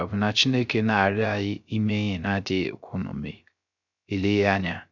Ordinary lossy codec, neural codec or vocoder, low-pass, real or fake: none; codec, 16 kHz, 0.3 kbps, FocalCodec; 7.2 kHz; fake